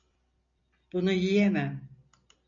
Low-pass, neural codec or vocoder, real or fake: 7.2 kHz; none; real